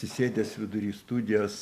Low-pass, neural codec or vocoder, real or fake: 14.4 kHz; none; real